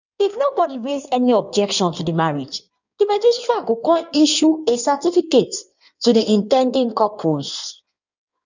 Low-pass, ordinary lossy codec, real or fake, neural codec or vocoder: 7.2 kHz; none; fake; codec, 16 kHz in and 24 kHz out, 1.1 kbps, FireRedTTS-2 codec